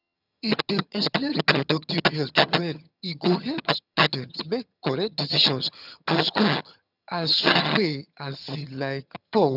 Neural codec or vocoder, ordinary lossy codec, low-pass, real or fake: vocoder, 22.05 kHz, 80 mel bands, HiFi-GAN; none; 5.4 kHz; fake